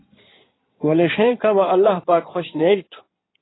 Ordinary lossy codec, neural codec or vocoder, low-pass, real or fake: AAC, 16 kbps; codec, 16 kHz in and 24 kHz out, 2.2 kbps, FireRedTTS-2 codec; 7.2 kHz; fake